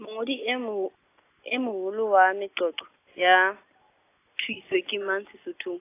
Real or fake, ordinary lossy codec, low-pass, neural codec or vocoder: real; AAC, 24 kbps; 3.6 kHz; none